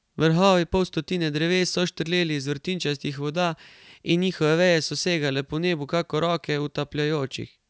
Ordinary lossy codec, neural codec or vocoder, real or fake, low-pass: none; none; real; none